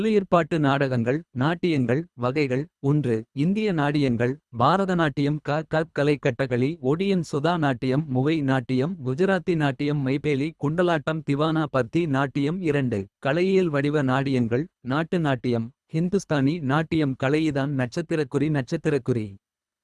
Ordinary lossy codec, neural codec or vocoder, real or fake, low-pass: none; codec, 24 kHz, 3 kbps, HILCodec; fake; none